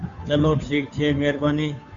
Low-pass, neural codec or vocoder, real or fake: 7.2 kHz; codec, 16 kHz, 2 kbps, FunCodec, trained on Chinese and English, 25 frames a second; fake